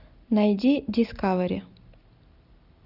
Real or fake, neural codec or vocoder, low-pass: real; none; 5.4 kHz